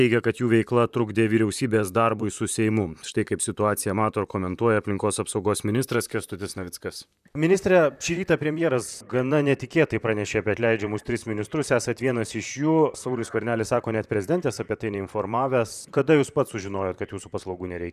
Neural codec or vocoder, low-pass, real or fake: vocoder, 44.1 kHz, 128 mel bands, Pupu-Vocoder; 14.4 kHz; fake